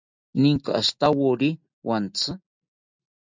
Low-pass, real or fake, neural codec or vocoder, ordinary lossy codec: 7.2 kHz; real; none; MP3, 64 kbps